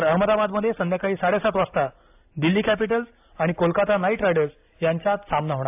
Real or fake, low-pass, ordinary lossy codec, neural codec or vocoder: real; 3.6 kHz; AAC, 32 kbps; none